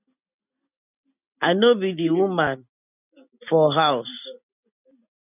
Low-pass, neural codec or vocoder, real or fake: 3.6 kHz; none; real